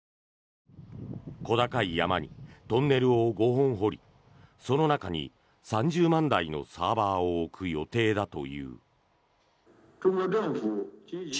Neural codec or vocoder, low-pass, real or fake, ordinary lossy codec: none; none; real; none